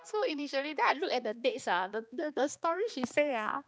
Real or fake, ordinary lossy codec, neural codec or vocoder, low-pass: fake; none; codec, 16 kHz, 2 kbps, X-Codec, HuBERT features, trained on balanced general audio; none